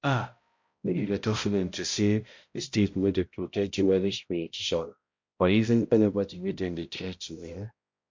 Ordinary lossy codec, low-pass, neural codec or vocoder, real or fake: MP3, 48 kbps; 7.2 kHz; codec, 16 kHz, 0.5 kbps, X-Codec, HuBERT features, trained on balanced general audio; fake